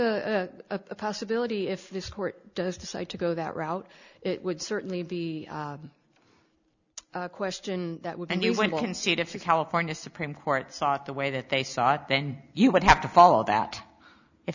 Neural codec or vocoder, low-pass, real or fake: none; 7.2 kHz; real